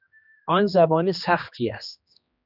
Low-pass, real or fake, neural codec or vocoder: 5.4 kHz; fake; codec, 16 kHz, 2 kbps, X-Codec, HuBERT features, trained on general audio